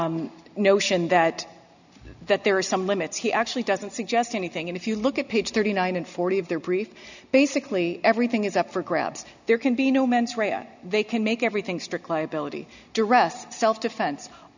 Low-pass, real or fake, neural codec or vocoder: 7.2 kHz; real; none